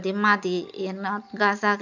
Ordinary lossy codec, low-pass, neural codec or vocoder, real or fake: none; 7.2 kHz; none; real